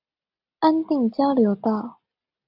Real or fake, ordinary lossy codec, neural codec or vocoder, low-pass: real; AAC, 48 kbps; none; 5.4 kHz